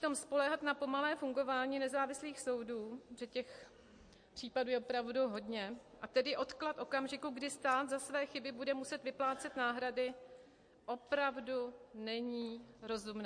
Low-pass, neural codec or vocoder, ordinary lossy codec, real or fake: 9.9 kHz; none; MP3, 48 kbps; real